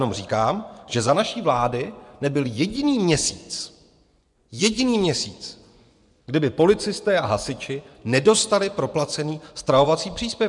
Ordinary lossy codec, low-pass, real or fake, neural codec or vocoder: MP3, 96 kbps; 10.8 kHz; fake; vocoder, 24 kHz, 100 mel bands, Vocos